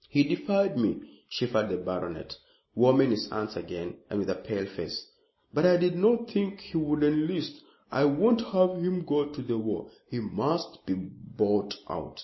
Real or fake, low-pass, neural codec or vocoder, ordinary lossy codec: real; 7.2 kHz; none; MP3, 24 kbps